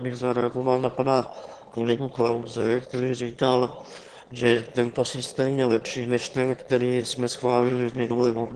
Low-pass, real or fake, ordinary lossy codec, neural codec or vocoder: 9.9 kHz; fake; Opus, 16 kbps; autoencoder, 22.05 kHz, a latent of 192 numbers a frame, VITS, trained on one speaker